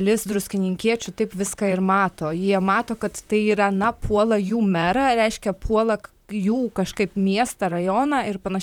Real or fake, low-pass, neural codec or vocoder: fake; 19.8 kHz; vocoder, 44.1 kHz, 128 mel bands, Pupu-Vocoder